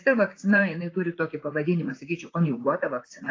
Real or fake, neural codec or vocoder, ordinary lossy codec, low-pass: fake; vocoder, 44.1 kHz, 128 mel bands, Pupu-Vocoder; AAC, 32 kbps; 7.2 kHz